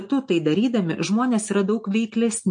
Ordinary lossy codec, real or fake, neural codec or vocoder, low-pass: MP3, 48 kbps; real; none; 9.9 kHz